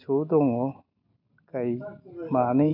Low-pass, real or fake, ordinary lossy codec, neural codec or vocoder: 5.4 kHz; real; MP3, 48 kbps; none